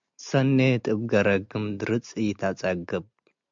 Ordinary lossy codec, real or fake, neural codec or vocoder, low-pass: MP3, 64 kbps; real; none; 7.2 kHz